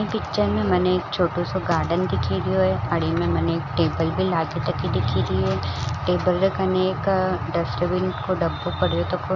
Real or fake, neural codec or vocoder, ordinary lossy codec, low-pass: real; none; none; 7.2 kHz